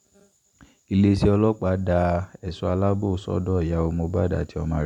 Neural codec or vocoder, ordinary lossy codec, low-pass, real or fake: vocoder, 44.1 kHz, 128 mel bands every 512 samples, BigVGAN v2; none; 19.8 kHz; fake